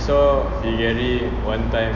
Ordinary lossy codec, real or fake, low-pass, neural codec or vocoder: none; real; 7.2 kHz; none